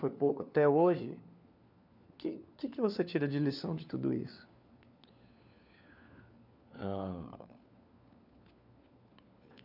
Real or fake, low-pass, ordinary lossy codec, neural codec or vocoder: fake; 5.4 kHz; none; codec, 16 kHz, 4 kbps, FunCodec, trained on LibriTTS, 50 frames a second